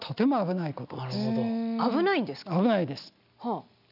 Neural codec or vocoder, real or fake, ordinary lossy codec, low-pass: none; real; none; 5.4 kHz